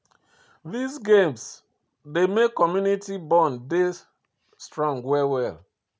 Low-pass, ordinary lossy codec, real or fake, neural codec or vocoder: none; none; real; none